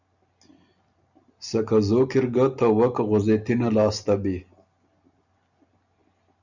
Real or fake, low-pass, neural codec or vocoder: real; 7.2 kHz; none